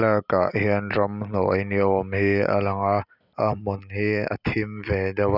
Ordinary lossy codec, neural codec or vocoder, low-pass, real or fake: none; none; 5.4 kHz; real